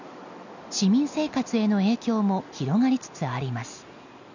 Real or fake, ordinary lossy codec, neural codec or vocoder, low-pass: real; none; none; 7.2 kHz